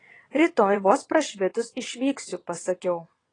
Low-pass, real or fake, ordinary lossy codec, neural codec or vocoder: 9.9 kHz; fake; AAC, 32 kbps; vocoder, 22.05 kHz, 80 mel bands, WaveNeXt